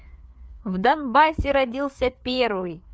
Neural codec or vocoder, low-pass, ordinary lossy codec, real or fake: codec, 16 kHz, 4 kbps, FreqCodec, larger model; none; none; fake